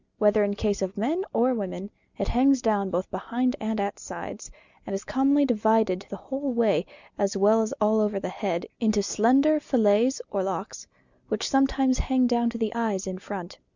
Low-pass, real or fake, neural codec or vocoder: 7.2 kHz; real; none